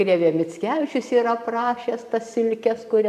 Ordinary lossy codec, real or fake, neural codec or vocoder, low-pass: AAC, 96 kbps; fake; vocoder, 44.1 kHz, 128 mel bands every 512 samples, BigVGAN v2; 14.4 kHz